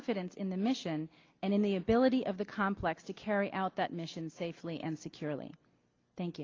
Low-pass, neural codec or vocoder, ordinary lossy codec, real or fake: 7.2 kHz; none; Opus, 24 kbps; real